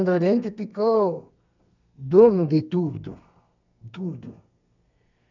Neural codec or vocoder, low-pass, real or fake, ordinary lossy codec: codec, 32 kHz, 1.9 kbps, SNAC; 7.2 kHz; fake; none